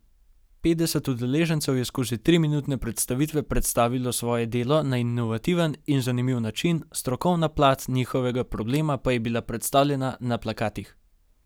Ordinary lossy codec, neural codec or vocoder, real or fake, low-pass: none; none; real; none